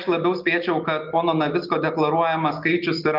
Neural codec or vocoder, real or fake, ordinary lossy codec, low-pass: none; real; Opus, 24 kbps; 5.4 kHz